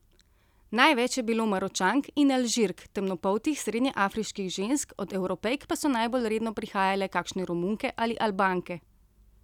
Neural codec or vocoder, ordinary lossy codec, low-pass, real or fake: none; none; 19.8 kHz; real